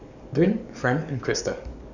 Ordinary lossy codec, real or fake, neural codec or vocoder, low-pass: none; fake; codec, 44.1 kHz, 7.8 kbps, DAC; 7.2 kHz